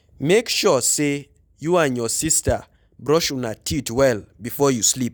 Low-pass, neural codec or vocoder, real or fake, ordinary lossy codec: none; none; real; none